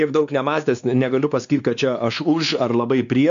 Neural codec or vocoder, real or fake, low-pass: codec, 16 kHz, 2 kbps, X-Codec, WavLM features, trained on Multilingual LibriSpeech; fake; 7.2 kHz